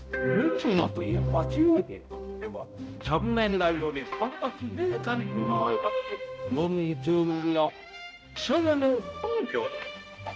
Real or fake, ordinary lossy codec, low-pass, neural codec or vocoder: fake; none; none; codec, 16 kHz, 0.5 kbps, X-Codec, HuBERT features, trained on balanced general audio